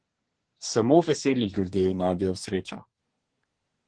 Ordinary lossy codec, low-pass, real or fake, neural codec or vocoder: Opus, 16 kbps; 9.9 kHz; fake; codec, 24 kHz, 1 kbps, SNAC